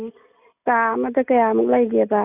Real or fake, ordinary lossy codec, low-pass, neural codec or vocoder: real; none; 3.6 kHz; none